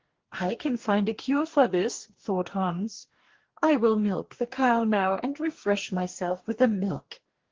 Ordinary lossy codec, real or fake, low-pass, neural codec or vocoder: Opus, 16 kbps; fake; 7.2 kHz; codec, 44.1 kHz, 2.6 kbps, DAC